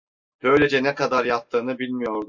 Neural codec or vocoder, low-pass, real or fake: none; 7.2 kHz; real